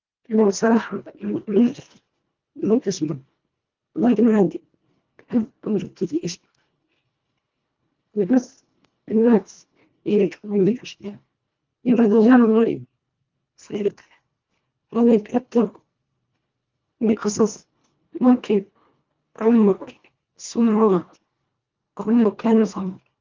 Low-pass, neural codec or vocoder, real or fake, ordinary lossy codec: 7.2 kHz; codec, 24 kHz, 1.5 kbps, HILCodec; fake; Opus, 24 kbps